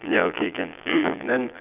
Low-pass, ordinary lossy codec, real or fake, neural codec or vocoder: 3.6 kHz; none; fake; vocoder, 22.05 kHz, 80 mel bands, Vocos